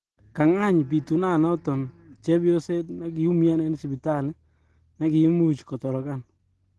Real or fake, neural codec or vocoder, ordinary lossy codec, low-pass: real; none; Opus, 16 kbps; 10.8 kHz